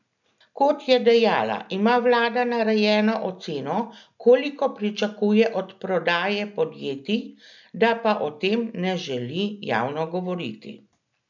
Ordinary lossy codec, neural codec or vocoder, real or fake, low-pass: none; none; real; 7.2 kHz